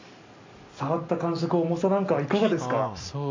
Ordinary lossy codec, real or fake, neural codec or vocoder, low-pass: MP3, 48 kbps; real; none; 7.2 kHz